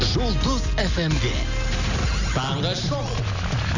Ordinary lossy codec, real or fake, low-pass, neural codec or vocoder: none; real; 7.2 kHz; none